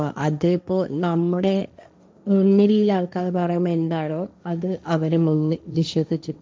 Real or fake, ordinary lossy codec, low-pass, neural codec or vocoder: fake; none; none; codec, 16 kHz, 1.1 kbps, Voila-Tokenizer